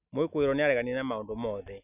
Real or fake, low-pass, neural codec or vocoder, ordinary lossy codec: real; 3.6 kHz; none; none